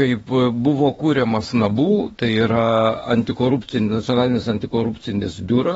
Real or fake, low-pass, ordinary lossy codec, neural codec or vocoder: fake; 19.8 kHz; AAC, 24 kbps; autoencoder, 48 kHz, 32 numbers a frame, DAC-VAE, trained on Japanese speech